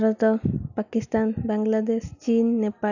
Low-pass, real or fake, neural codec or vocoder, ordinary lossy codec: 7.2 kHz; real; none; none